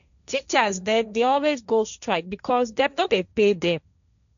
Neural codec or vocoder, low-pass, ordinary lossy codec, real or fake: codec, 16 kHz, 1.1 kbps, Voila-Tokenizer; 7.2 kHz; none; fake